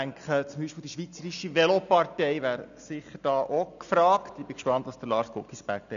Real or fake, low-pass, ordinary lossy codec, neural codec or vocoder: real; 7.2 kHz; none; none